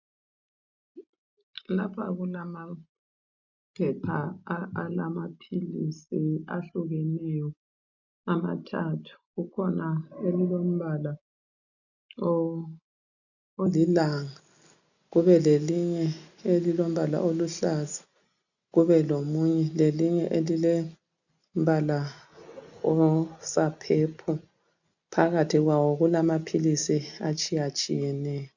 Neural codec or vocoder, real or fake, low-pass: none; real; 7.2 kHz